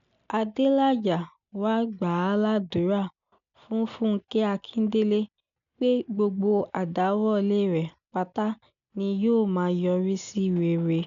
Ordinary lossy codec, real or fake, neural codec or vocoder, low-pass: none; real; none; 7.2 kHz